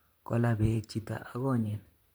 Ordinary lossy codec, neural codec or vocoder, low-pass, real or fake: none; vocoder, 44.1 kHz, 128 mel bands, Pupu-Vocoder; none; fake